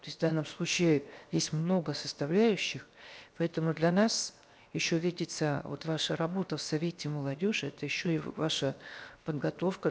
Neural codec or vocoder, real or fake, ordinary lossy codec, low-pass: codec, 16 kHz, 0.7 kbps, FocalCodec; fake; none; none